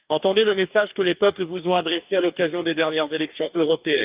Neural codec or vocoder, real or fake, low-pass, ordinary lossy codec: codec, 44.1 kHz, 2.6 kbps, DAC; fake; 3.6 kHz; Opus, 24 kbps